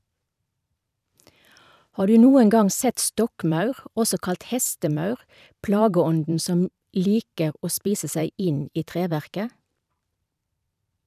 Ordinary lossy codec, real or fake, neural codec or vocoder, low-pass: none; fake; vocoder, 44.1 kHz, 128 mel bands every 512 samples, BigVGAN v2; 14.4 kHz